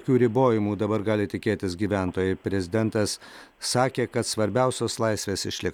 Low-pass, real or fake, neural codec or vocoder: 19.8 kHz; real; none